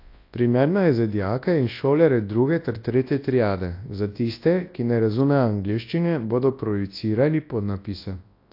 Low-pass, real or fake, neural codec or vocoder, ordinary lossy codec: 5.4 kHz; fake; codec, 24 kHz, 0.9 kbps, WavTokenizer, large speech release; AAC, 32 kbps